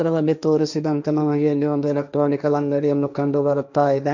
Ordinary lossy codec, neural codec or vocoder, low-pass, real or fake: none; codec, 16 kHz, 1.1 kbps, Voila-Tokenizer; 7.2 kHz; fake